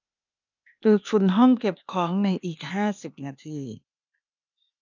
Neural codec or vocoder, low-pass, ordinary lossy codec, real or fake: codec, 16 kHz, 0.8 kbps, ZipCodec; 7.2 kHz; none; fake